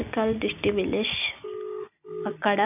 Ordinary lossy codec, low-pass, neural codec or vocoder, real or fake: none; 3.6 kHz; none; real